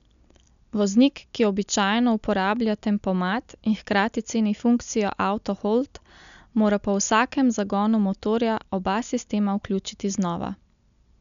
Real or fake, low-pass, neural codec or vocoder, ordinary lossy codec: real; 7.2 kHz; none; none